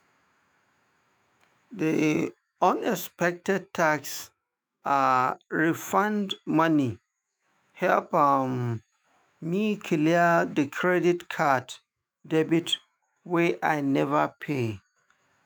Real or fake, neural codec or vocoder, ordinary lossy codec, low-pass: fake; autoencoder, 48 kHz, 128 numbers a frame, DAC-VAE, trained on Japanese speech; none; none